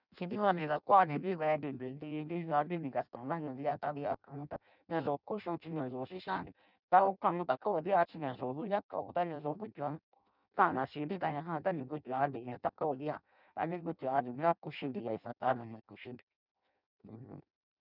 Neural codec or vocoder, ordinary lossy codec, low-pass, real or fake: codec, 16 kHz in and 24 kHz out, 0.6 kbps, FireRedTTS-2 codec; none; 5.4 kHz; fake